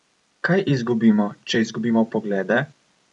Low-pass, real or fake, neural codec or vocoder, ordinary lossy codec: 10.8 kHz; fake; vocoder, 44.1 kHz, 128 mel bands every 256 samples, BigVGAN v2; none